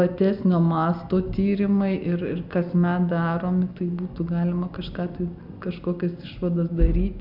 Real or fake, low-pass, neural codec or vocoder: real; 5.4 kHz; none